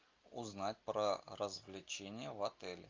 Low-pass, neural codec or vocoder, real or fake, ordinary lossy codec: 7.2 kHz; none; real; Opus, 24 kbps